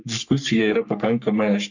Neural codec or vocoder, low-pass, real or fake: codec, 44.1 kHz, 3.4 kbps, Pupu-Codec; 7.2 kHz; fake